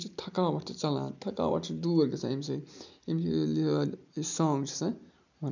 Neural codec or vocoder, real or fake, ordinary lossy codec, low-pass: none; real; none; 7.2 kHz